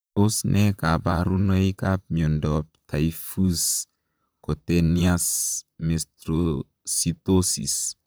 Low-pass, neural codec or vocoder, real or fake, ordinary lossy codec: none; vocoder, 44.1 kHz, 128 mel bands, Pupu-Vocoder; fake; none